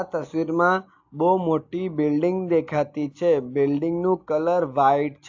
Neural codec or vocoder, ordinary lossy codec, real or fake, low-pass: none; AAC, 48 kbps; real; 7.2 kHz